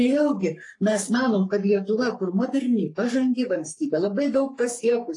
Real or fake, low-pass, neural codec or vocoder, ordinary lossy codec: fake; 10.8 kHz; codec, 44.1 kHz, 3.4 kbps, Pupu-Codec; AAC, 48 kbps